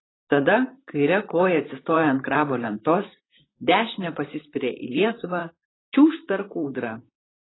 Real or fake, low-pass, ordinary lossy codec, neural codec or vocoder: fake; 7.2 kHz; AAC, 16 kbps; vocoder, 44.1 kHz, 128 mel bands, Pupu-Vocoder